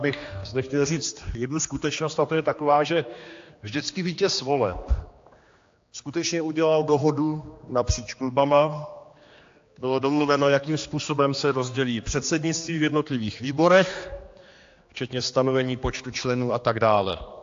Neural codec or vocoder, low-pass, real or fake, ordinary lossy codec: codec, 16 kHz, 2 kbps, X-Codec, HuBERT features, trained on general audio; 7.2 kHz; fake; AAC, 48 kbps